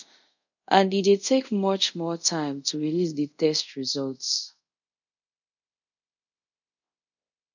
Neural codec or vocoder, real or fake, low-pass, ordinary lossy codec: codec, 24 kHz, 0.5 kbps, DualCodec; fake; 7.2 kHz; none